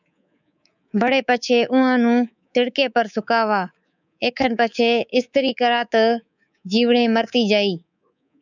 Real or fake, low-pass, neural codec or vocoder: fake; 7.2 kHz; codec, 24 kHz, 3.1 kbps, DualCodec